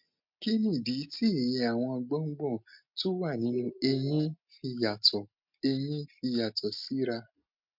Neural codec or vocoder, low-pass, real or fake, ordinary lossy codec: none; 5.4 kHz; real; MP3, 48 kbps